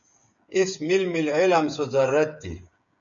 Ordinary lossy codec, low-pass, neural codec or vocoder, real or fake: MP3, 96 kbps; 7.2 kHz; codec, 16 kHz, 8 kbps, FreqCodec, smaller model; fake